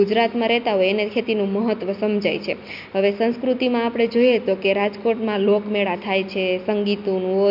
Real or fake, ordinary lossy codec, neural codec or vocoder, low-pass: real; MP3, 48 kbps; none; 5.4 kHz